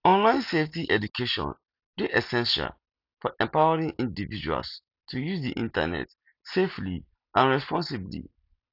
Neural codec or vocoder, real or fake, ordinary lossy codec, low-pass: none; real; none; 5.4 kHz